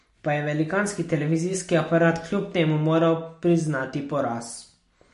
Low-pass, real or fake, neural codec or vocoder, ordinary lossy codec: 10.8 kHz; real; none; MP3, 48 kbps